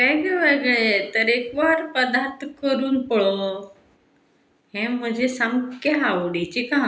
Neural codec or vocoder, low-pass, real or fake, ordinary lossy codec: none; none; real; none